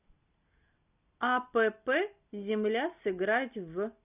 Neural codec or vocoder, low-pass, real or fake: none; 3.6 kHz; real